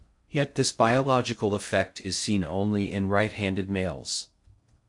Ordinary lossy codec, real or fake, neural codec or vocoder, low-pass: MP3, 64 kbps; fake; codec, 16 kHz in and 24 kHz out, 0.6 kbps, FocalCodec, streaming, 4096 codes; 10.8 kHz